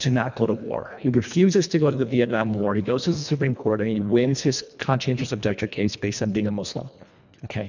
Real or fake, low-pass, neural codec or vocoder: fake; 7.2 kHz; codec, 24 kHz, 1.5 kbps, HILCodec